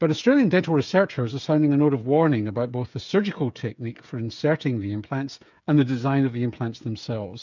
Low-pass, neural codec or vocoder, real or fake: 7.2 kHz; codec, 16 kHz, 8 kbps, FreqCodec, smaller model; fake